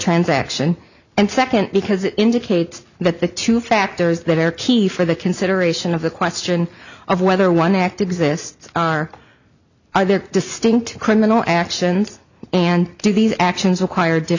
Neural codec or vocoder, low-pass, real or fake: none; 7.2 kHz; real